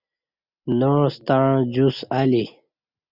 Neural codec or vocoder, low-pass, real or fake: none; 5.4 kHz; real